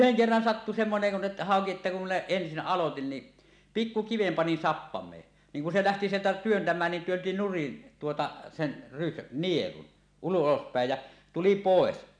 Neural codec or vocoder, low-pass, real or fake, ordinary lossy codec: none; 9.9 kHz; real; none